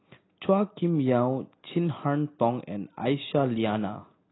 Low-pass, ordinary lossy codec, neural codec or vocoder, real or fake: 7.2 kHz; AAC, 16 kbps; none; real